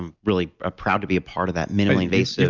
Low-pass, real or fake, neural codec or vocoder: 7.2 kHz; real; none